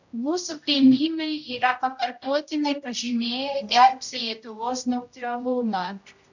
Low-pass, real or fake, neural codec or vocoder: 7.2 kHz; fake; codec, 16 kHz, 0.5 kbps, X-Codec, HuBERT features, trained on general audio